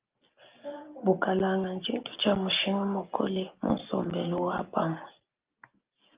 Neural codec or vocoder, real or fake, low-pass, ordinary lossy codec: none; real; 3.6 kHz; Opus, 24 kbps